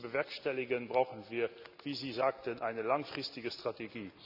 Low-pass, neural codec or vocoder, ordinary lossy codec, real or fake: 5.4 kHz; none; none; real